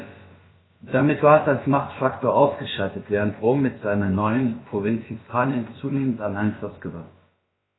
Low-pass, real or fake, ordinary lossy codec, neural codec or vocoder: 7.2 kHz; fake; AAC, 16 kbps; codec, 16 kHz, about 1 kbps, DyCAST, with the encoder's durations